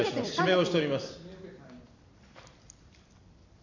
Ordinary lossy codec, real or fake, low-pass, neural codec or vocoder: none; fake; 7.2 kHz; vocoder, 44.1 kHz, 128 mel bands every 256 samples, BigVGAN v2